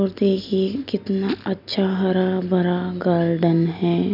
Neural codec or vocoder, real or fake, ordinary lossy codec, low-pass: none; real; none; 5.4 kHz